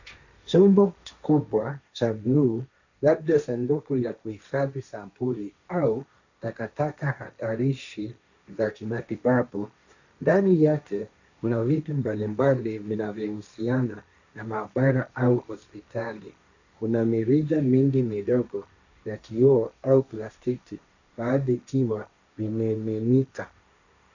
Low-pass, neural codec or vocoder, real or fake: 7.2 kHz; codec, 16 kHz, 1.1 kbps, Voila-Tokenizer; fake